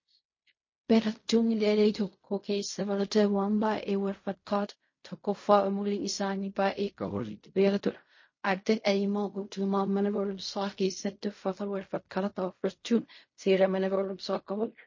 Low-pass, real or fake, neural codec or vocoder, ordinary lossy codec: 7.2 kHz; fake; codec, 16 kHz in and 24 kHz out, 0.4 kbps, LongCat-Audio-Codec, fine tuned four codebook decoder; MP3, 32 kbps